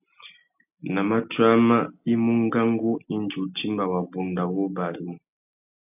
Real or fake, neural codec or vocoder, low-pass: real; none; 3.6 kHz